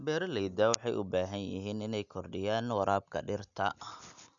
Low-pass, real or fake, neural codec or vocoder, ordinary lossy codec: 7.2 kHz; real; none; none